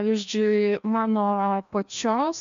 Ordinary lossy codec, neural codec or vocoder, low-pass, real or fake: MP3, 64 kbps; codec, 16 kHz, 1 kbps, FreqCodec, larger model; 7.2 kHz; fake